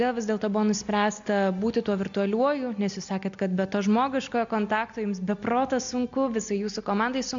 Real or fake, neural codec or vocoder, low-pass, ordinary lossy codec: real; none; 7.2 kHz; MP3, 64 kbps